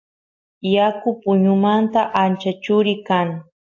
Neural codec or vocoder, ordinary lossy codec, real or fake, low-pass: none; AAC, 48 kbps; real; 7.2 kHz